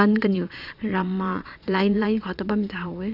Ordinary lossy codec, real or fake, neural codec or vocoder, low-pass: none; fake; vocoder, 22.05 kHz, 80 mel bands, Vocos; 5.4 kHz